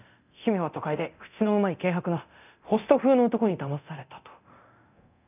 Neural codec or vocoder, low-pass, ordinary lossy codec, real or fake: codec, 24 kHz, 0.9 kbps, DualCodec; 3.6 kHz; none; fake